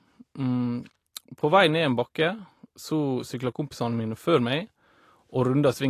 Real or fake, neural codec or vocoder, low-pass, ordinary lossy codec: real; none; 14.4 kHz; AAC, 48 kbps